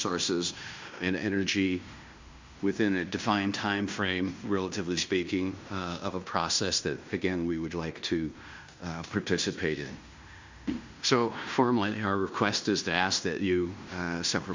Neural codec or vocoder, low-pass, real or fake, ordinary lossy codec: codec, 16 kHz in and 24 kHz out, 0.9 kbps, LongCat-Audio-Codec, fine tuned four codebook decoder; 7.2 kHz; fake; MP3, 64 kbps